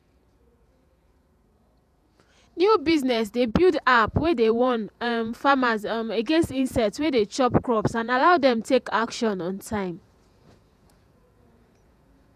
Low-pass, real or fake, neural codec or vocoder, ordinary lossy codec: 14.4 kHz; fake; vocoder, 48 kHz, 128 mel bands, Vocos; none